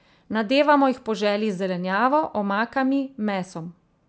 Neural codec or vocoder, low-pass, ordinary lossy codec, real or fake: none; none; none; real